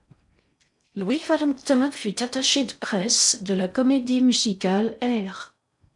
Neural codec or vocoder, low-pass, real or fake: codec, 16 kHz in and 24 kHz out, 0.6 kbps, FocalCodec, streaming, 4096 codes; 10.8 kHz; fake